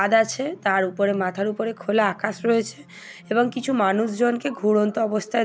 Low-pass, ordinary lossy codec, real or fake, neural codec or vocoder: none; none; real; none